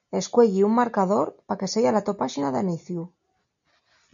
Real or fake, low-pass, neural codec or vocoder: real; 7.2 kHz; none